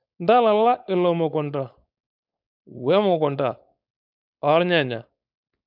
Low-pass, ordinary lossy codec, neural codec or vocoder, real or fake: 5.4 kHz; none; codec, 16 kHz, 4.8 kbps, FACodec; fake